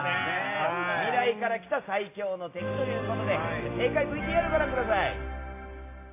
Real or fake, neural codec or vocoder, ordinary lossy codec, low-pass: real; none; AAC, 24 kbps; 3.6 kHz